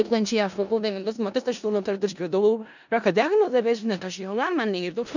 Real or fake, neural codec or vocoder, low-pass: fake; codec, 16 kHz in and 24 kHz out, 0.4 kbps, LongCat-Audio-Codec, four codebook decoder; 7.2 kHz